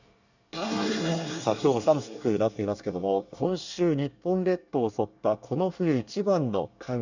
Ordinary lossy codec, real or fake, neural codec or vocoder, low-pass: none; fake; codec, 24 kHz, 1 kbps, SNAC; 7.2 kHz